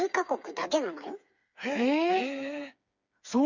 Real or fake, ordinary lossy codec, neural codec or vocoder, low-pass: fake; Opus, 64 kbps; codec, 16 kHz, 4 kbps, FreqCodec, smaller model; 7.2 kHz